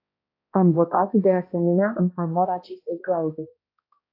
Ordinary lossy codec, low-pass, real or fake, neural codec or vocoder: AAC, 32 kbps; 5.4 kHz; fake; codec, 16 kHz, 1 kbps, X-Codec, HuBERT features, trained on balanced general audio